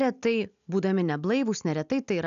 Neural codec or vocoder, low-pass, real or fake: none; 7.2 kHz; real